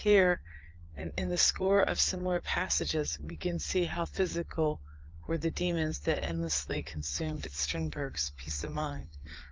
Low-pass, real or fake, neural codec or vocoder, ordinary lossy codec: 7.2 kHz; fake; vocoder, 22.05 kHz, 80 mel bands, Vocos; Opus, 32 kbps